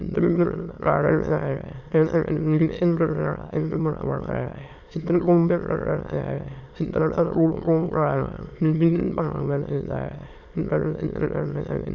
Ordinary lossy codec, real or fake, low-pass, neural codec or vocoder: none; fake; 7.2 kHz; autoencoder, 22.05 kHz, a latent of 192 numbers a frame, VITS, trained on many speakers